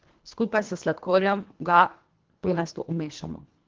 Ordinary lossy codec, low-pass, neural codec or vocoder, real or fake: Opus, 16 kbps; 7.2 kHz; codec, 24 kHz, 1.5 kbps, HILCodec; fake